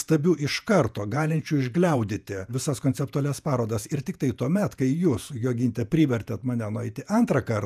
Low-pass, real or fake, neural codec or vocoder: 14.4 kHz; fake; vocoder, 48 kHz, 128 mel bands, Vocos